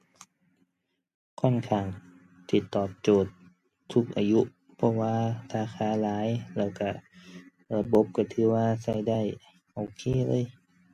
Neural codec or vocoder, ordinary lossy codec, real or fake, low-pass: none; MP3, 64 kbps; real; 14.4 kHz